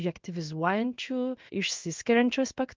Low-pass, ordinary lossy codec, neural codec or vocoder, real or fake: 7.2 kHz; Opus, 32 kbps; codec, 16 kHz in and 24 kHz out, 1 kbps, XY-Tokenizer; fake